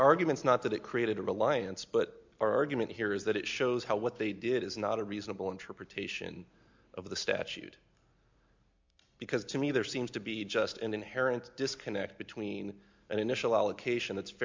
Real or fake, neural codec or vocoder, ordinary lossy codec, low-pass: real; none; MP3, 64 kbps; 7.2 kHz